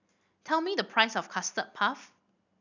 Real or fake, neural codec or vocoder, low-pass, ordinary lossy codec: real; none; 7.2 kHz; none